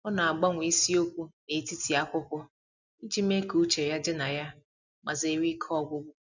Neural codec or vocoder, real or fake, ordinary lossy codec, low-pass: none; real; none; 7.2 kHz